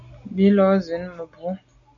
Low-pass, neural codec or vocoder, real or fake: 7.2 kHz; none; real